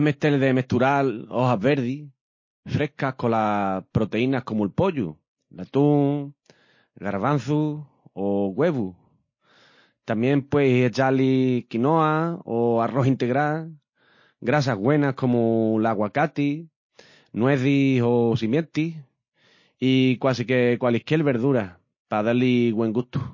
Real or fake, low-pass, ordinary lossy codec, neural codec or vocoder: real; 7.2 kHz; MP3, 32 kbps; none